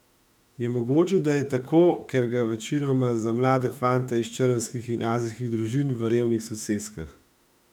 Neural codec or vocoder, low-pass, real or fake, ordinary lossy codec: autoencoder, 48 kHz, 32 numbers a frame, DAC-VAE, trained on Japanese speech; 19.8 kHz; fake; none